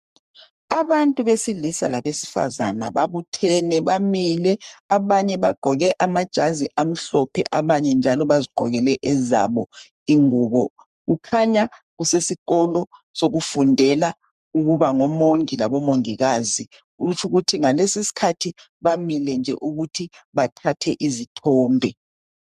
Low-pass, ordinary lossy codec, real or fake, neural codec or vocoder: 14.4 kHz; MP3, 96 kbps; fake; codec, 44.1 kHz, 3.4 kbps, Pupu-Codec